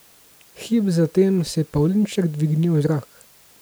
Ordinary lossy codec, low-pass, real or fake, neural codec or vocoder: none; none; real; none